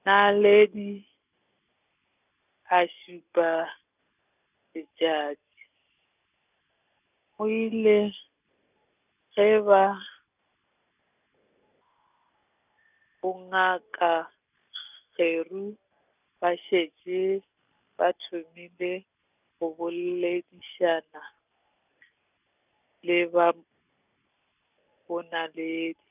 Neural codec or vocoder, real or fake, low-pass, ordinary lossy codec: none; real; 3.6 kHz; none